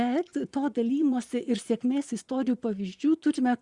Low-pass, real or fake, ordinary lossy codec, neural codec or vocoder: 10.8 kHz; fake; Opus, 64 kbps; vocoder, 24 kHz, 100 mel bands, Vocos